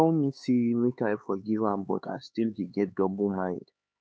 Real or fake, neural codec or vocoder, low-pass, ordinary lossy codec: fake; codec, 16 kHz, 4 kbps, X-Codec, HuBERT features, trained on LibriSpeech; none; none